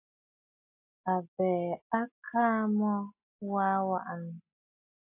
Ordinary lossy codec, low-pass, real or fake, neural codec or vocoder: AAC, 24 kbps; 3.6 kHz; real; none